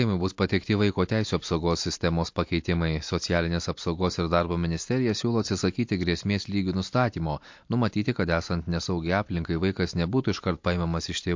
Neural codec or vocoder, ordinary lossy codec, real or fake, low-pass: none; MP3, 48 kbps; real; 7.2 kHz